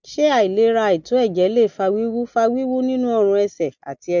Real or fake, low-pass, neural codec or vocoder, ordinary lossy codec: real; 7.2 kHz; none; none